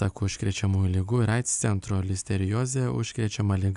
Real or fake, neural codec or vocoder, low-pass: real; none; 10.8 kHz